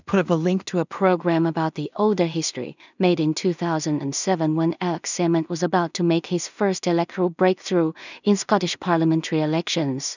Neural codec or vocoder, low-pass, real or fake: codec, 16 kHz in and 24 kHz out, 0.4 kbps, LongCat-Audio-Codec, two codebook decoder; 7.2 kHz; fake